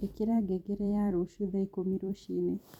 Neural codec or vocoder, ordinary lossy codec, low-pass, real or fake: vocoder, 44.1 kHz, 128 mel bands every 256 samples, BigVGAN v2; none; 19.8 kHz; fake